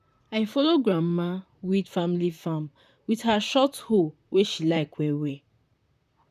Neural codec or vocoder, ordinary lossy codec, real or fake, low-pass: vocoder, 44.1 kHz, 128 mel bands, Pupu-Vocoder; none; fake; 14.4 kHz